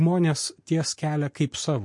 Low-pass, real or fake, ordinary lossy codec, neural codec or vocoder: 10.8 kHz; real; MP3, 48 kbps; none